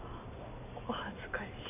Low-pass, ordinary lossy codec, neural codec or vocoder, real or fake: 3.6 kHz; none; none; real